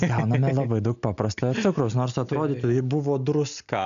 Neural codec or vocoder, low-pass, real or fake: none; 7.2 kHz; real